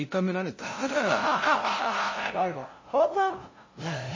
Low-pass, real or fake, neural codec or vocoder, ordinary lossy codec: 7.2 kHz; fake; codec, 16 kHz, 0.5 kbps, FunCodec, trained on LibriTTS, 25 frames a second; MP3, 32 kbps